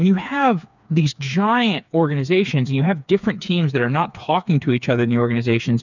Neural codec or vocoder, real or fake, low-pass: codec, 16 kHz, 4 kbps, FreqCodec, smaller model; fake; 7.2 kHz